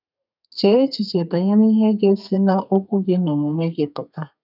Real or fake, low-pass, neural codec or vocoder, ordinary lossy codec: fake; 5.4 kHz; codec, 32 kHz, 1.9 kbps, SNAC; none